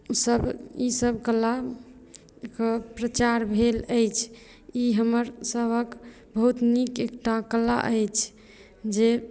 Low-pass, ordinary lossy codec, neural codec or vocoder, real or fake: none; none; none; real